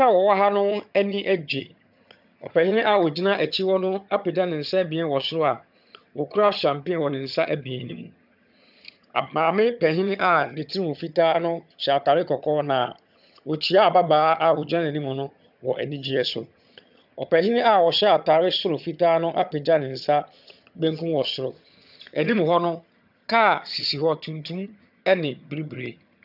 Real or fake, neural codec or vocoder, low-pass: fake; vocoder, 22.05 kHz, 80 mel bands, HiFi-GAN; 5.4 kHz